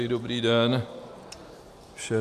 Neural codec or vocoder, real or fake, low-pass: vocoder, 44.1 kHz, 128 mel bands every 512 samples, BigVGAN v2; fake; 14.4 kHz